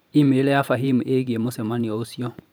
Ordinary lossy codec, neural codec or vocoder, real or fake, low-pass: none; vocoder, 44.1 kHz, 128 mel bands every 512 samples, BigVGAN v2; fake; none